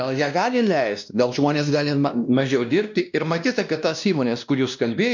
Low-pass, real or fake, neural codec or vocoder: 7.2 kHz; fake; codec, 16 kHz, 1 kbps, X-Codec, WavLM features, trained on Multilingual LibriSpeech